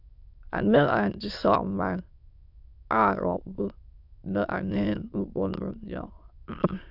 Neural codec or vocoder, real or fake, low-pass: autoencoder, 22.05 kHz, a latent of 192 numbers a frame, VITS, trained on many speakers; fake; 5.4 kHz